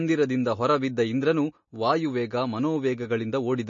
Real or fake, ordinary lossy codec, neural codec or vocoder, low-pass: real; MP3, 32 kbps; none; 7.2 kHz